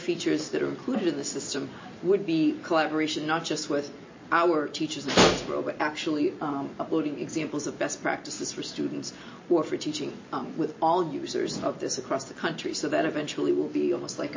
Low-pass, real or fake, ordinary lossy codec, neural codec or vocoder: 7.2 kHz; real; MP3, 32 kbps; none